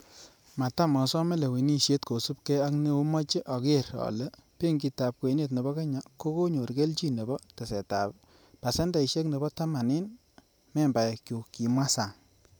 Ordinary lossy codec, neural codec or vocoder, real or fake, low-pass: none; vocoder, 44.1 kHz, 128 mel bands every 512 samples, BigVGAN v2; fake; none